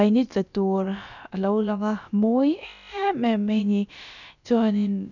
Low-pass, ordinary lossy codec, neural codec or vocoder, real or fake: 7.2 kHz; none; codec, 16 kHz, about 1 kbps, DyCAST, with the encoder's durations; fake